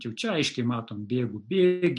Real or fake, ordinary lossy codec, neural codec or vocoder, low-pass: real; MP3, 64 kbps; none; 10.8 kHz